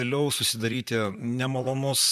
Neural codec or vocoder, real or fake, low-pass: vocoder, 44.1 kHz, 128 mel bands, Pupu-Vocoder; fake; 14.4 kHz